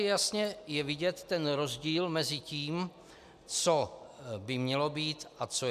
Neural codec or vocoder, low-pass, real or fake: none; 14.4 kHz; real